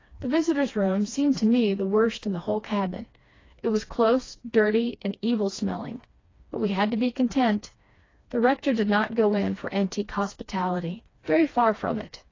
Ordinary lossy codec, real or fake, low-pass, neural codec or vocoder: AAC, 32 kbps; fake; 7.2 kHz; codec, 16 kHz, 2 kbps, FreqCodec, smaller model